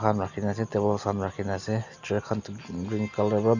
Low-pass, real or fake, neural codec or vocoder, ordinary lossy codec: 7.2 kHz; real; none; none